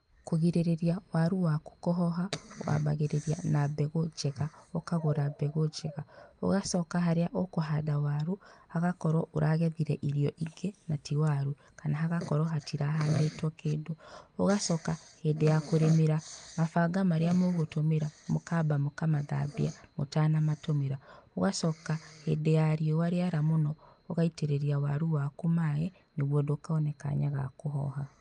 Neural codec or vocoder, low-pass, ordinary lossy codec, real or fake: none; 9.9 kHz; Opus, 32 kbps; real